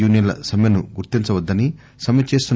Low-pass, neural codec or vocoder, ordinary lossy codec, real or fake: none; none; none; real